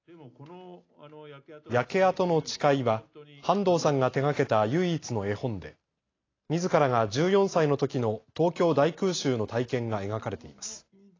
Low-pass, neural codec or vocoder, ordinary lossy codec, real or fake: 7.2 kHz; none; AAC, 32 kbps; real